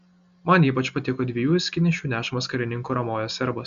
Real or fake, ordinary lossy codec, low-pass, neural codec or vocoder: real; MP3, 64 kbps; 7.2 kHz; none